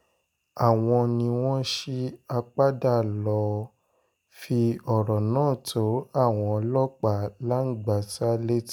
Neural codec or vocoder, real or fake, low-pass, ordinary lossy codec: none; real; 19.8 kHz; none